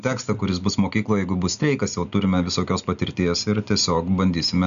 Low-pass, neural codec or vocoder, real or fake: 7.2 kHz; none; real